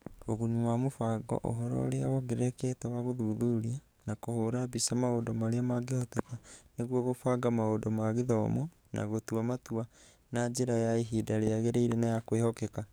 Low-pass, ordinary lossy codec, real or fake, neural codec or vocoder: none; none; fake; codec, 44.1 kHz, 7.8 kbps, DAC